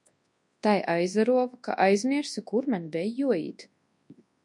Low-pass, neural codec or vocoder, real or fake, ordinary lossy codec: 10.8 kHz; codec, 24 kHz, 0.9 kbps, WavTokenizer, large speech release; fake; MP3, 64 kbps